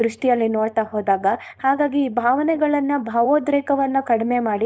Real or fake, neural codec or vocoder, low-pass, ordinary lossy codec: fake; codec, 16 kHz, 4.8 kbps, FACodec; none; none